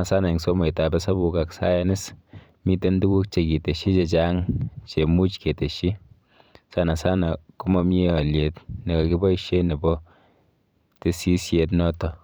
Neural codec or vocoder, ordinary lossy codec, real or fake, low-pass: vocoder, 44.1 kHz, 128 mel bands every 512 samples, BigVGAN v2; none; fake; none